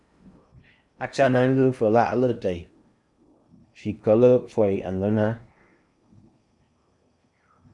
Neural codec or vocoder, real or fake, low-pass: codec, 16 kHz in and 24 kHz out, 0.8 kbps, FocalCodec, streaming, 65536 codes; fake; 10.8 kHz